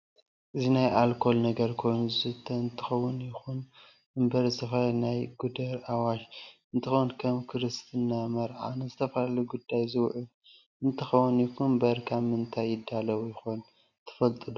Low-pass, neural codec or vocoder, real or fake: 7.2 kHz; none; real